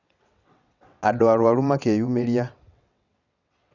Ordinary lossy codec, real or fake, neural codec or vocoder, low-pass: none; fake; vocoder, 22.05 kHz, 80 mel bands, WaveNeXt; 7.2 kHz